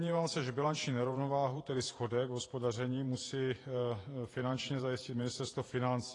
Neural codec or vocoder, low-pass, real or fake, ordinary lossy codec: vocoder, 48 kHz, 128 mel bands, Vocos; 10.8 kHz; fake; AAC, 32 kbps